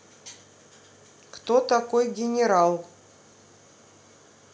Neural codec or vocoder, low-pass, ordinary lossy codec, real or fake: none; none; none; real